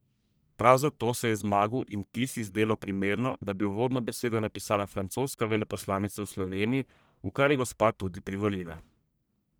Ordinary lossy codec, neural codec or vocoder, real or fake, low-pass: none; codec, 44.1 kHz, 1.7 kbps, Pupu-Codec; fake; none